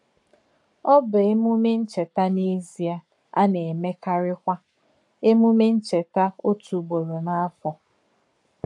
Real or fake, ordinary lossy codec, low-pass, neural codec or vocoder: fake; AAC, 64 kbps; 10.8 kHz; codec, 44.1 kHz, 7.8 kbps, Pupu-Codec